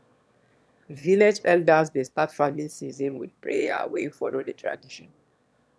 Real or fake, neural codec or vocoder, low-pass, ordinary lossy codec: fake; autoencoder, 22.05 kHz, a latent of 192 numbers a frame, VITS, trained on one speaker; none; none